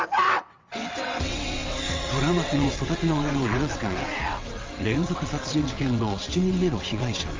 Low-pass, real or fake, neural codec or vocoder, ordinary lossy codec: 7.2 kHz; fake; vocoder, 22.05 kHz, 80 mel bands, WaveNeXt; Opus, 32 kbps